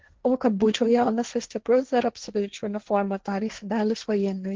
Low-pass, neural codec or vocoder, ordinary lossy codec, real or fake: 7.2 kHz; codec, 16 kHz, 1.1 kbps, Voila-Tokenizer; Opus, 16 kbps; fake